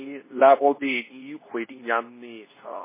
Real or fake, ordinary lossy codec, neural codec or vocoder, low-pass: fake; MP3, 16 kbps; codec, 24 kHz, 0.9 kbps, WavTokenizer, medium speech release version 2; 3.6 kHz